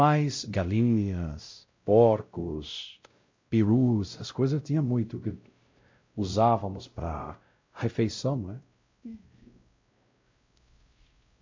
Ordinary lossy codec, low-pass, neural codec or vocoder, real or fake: MP3, 48 kbps; 7.2 kHz; codec, 16 kHz, 0.5 kbps, X-Codec, WavLM features, trained on Multilingual LibriSpeech; fake